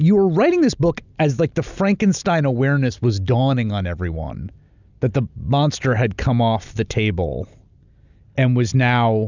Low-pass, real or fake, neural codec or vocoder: 7.2 kHz; real; none